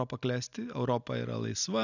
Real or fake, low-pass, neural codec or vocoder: real; 7.2 kHz; none